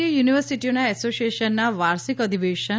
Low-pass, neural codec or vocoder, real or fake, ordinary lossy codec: none; none; real; none